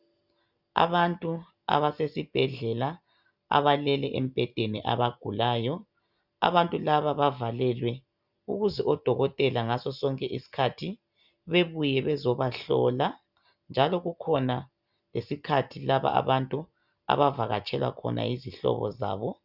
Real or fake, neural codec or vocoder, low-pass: real; none; 5.4 kHz